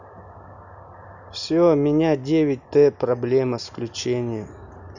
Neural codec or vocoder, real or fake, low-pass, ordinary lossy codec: none; real; 7.2 kHz; none